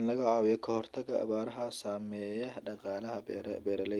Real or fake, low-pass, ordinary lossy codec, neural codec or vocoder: real; 19.8 kHz; Opus, 32 kbps; none